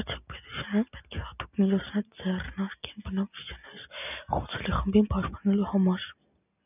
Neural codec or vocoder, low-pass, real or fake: none; 3.6 kHz; real